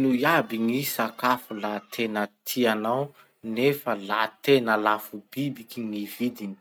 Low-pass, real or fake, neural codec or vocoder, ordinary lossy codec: none; real; none; none